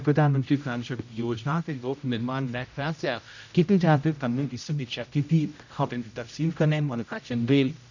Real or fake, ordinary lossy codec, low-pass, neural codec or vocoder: fake; none; 7.2 kHz; codec, 16 kHz, 0.5 kbps, X-Codec, HuBERT features, trained on general audio